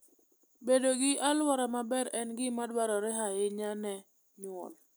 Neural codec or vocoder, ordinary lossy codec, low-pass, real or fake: none; none; none; real